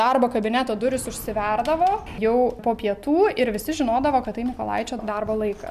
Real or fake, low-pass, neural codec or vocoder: real; 14.4 kHz; none